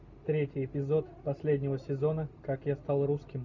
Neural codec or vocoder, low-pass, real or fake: none; 7.2 kHz; real